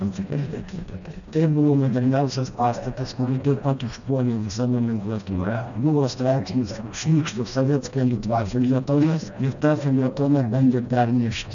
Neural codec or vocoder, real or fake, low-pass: codec, 16 kHz, 1 kbps, FreqCodec, smaller model; fake; 7.2 kHz